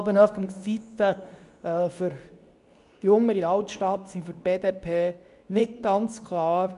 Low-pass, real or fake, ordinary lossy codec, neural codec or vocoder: 10.8 kHz; fake; none; codec, 24 kHz, 0.9 kbps, WavTokenizer, medium speech release version 2